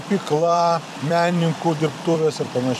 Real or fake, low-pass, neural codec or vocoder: fake; 14.4 kHz; vocoder, 44.1 kHz, 128 mel bands every 256 samples, BigVGAN v2